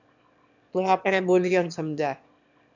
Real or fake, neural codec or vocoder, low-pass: fake; autoencoder, 22.05 kHz, a latent of 192 numbers a frame, VITS, trained on one speaker; 7.2 kHz